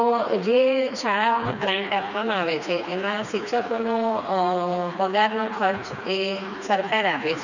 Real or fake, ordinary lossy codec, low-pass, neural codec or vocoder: fake; none; 7.2 kHz; codec, 16 kHz, 2 kbps, FreqCodec, smaller model